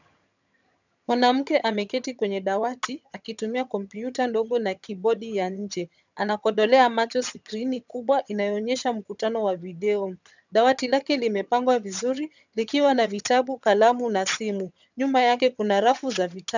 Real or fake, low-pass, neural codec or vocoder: fake; 7.2 kHz; vocoder, 22.05 kHz, 80 mel bands, HiFi-GAN